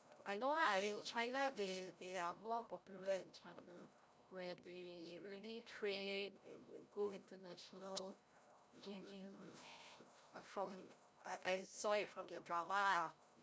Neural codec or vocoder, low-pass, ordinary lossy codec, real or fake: codec, 16 kHz, 0.5 kbps, FreqCodec, larger model; none; none; fake